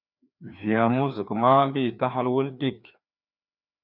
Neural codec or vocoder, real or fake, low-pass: codec, 16 kHz, 2 kbps, FreqCodec, larger model; fake; 5.4 kHz